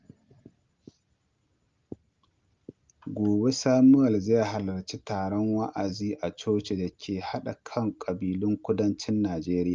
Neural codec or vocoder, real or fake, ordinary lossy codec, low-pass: none; real; Opus, 24 kbps; 7.2 kHz